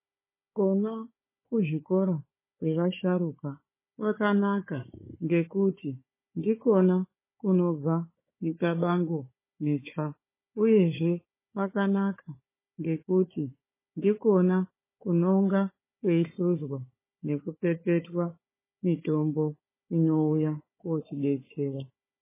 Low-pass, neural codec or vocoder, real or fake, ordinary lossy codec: 3.6 kHz; codec, 16 kHz, 4 kbps, FunCodec, trained on Chinese and English, 50 frames a second; fake; MP3, 16 kbps